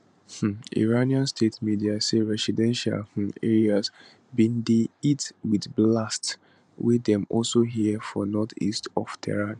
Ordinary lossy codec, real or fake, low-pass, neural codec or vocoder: none; fake; 10.8 kHz; vocoder, 44.1 kHz, 128 mel bands every 512 samples, BigVGAN v2